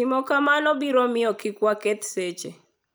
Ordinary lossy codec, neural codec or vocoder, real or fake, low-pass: none; none; real; none